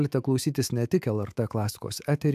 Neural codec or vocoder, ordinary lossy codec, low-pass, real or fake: autoencoder, 48 kHz, 128 numbers a frame, DAC-VAE, trained on Japanese speech; AAC, 96 kbps; 14.4 kHz; fake